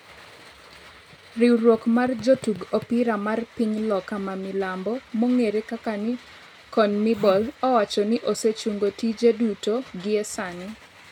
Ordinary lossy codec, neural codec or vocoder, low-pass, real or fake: none; none; 19.8 kHz; real